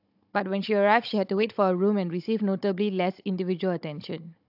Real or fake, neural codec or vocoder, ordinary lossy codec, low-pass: fake; codec, 16 kHz, 16 kbps, FunCodec, trained on Chinese and English, 50 frames a second; AAC, 48 kbps; 5.4 kHz